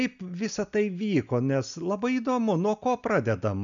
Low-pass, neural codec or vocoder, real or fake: 7.2 kHz; none; real